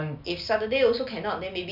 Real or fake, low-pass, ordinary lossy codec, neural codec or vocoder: real; 5.4 kHz; none; none